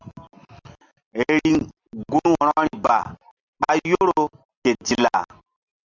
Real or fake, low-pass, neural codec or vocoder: real; 7.2 kHz; none